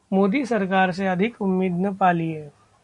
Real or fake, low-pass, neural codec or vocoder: real; 10.8 kHz; none